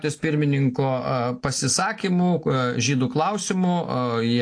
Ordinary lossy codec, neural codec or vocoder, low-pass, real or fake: AAC, 48 kbps; none; 9.9 kHz; real